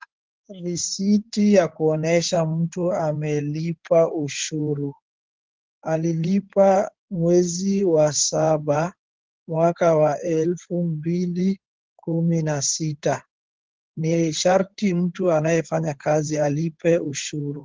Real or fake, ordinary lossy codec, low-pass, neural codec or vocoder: fake; Opus, 16 kbps; 7.2 kHz; codec, 16 kHz in and 24 kHz out, 2.2 kbps, FireRedTTS-2 codec